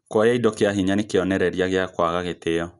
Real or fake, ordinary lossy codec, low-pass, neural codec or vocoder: real; none; 10.8 kHz; none